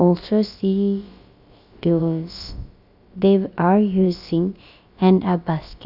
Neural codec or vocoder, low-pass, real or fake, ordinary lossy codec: codec, 16 kHz, about 1 kbps, DyCAST, with the encoder's durations; 5.4 kHz; fake; Opus, 64 kbps